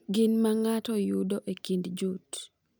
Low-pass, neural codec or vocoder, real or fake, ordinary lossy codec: none; none; real; none